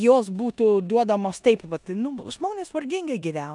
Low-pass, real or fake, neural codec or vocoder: 10.8 kHz; fake; codec, 16 kHz in and 24 kHz out, 0.9 kbps, LongCat-Audio-Codec, four codebook decoder